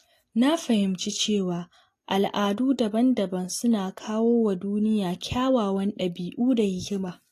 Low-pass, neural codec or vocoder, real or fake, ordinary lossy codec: 14.4 kHz; none; real; AAC, 48 kbps